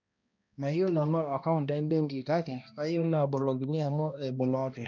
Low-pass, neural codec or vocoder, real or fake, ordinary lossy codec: 7.2 kHz; codec, 16 kHz, 1 kbps, X-Codec, HuBERT features, trained on balanced general audio; fake; none